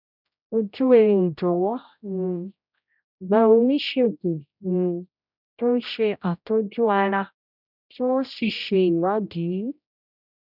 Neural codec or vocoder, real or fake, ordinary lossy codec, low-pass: codec, 16 kHz, 0.5 kbps, X-Codec, HuBERT features, trained on general audio; fake; none; 5.4 kHz